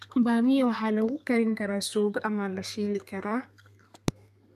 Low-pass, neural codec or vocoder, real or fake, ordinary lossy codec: 14.4 kHz; codec, 32 kHz, 1.9 kbps, SNAC; fake; none